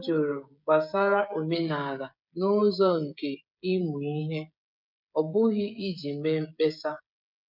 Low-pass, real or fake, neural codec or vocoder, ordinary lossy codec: 5.4 kHz; fake; codec, 16 kHz, 16 kbps, FreqCodec, smaller model; none